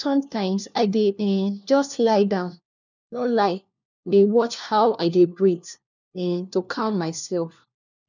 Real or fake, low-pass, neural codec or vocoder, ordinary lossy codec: fake; 7.2 kHz; codec, 16 kHz, 1 kbps, FunCodec, trained on LibriTTS, 50 frames a second; none